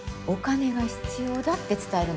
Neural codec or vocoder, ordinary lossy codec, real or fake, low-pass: none; none; real; none